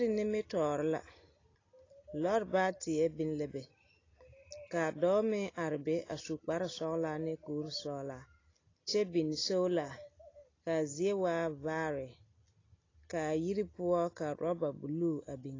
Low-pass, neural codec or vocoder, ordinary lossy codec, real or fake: 7.2 kHz; none; AAC, 32 kbps; real